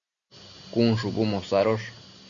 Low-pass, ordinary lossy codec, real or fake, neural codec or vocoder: 7.2 kHz; Opus, 64 kbps; real; none